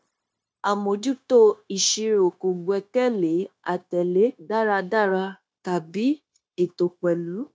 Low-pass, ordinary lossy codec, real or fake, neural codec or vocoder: none; none; fake; codec, 16 kHz, 0.9 kbps, LongCat-Audio-Codec